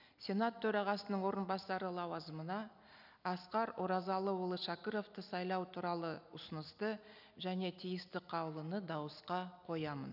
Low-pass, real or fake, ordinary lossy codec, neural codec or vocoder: 5.4 kHz; real; none; none